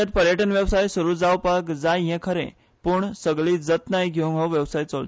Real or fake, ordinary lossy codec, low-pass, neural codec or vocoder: real; none; none; none